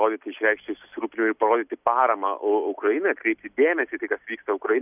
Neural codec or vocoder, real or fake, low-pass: none; real; 3.6 kHz